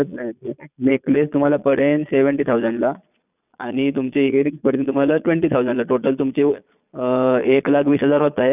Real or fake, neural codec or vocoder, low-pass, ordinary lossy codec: fake; vocoder, 22.05 kHz, 80 mel bands, Vocos; 3.6 kHz; none